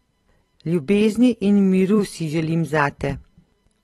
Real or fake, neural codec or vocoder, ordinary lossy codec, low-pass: fake; vocoder, 44.1 kHz, 128 mel bands every 256 samples, BigVGAN v2; AAC, 32 kbps; 19.8 kHz